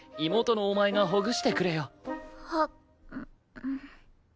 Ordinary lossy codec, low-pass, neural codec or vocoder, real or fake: none; none; none; real